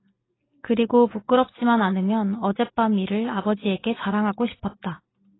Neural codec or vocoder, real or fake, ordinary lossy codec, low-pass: none; real; AAC, 16 kbps; 7.2 kHz